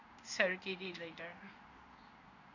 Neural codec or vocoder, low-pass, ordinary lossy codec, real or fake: codec, 16 kHz in and 24 kHz out, 1 kbps, XY-Tokenizer; 7.2 kHz; none; fake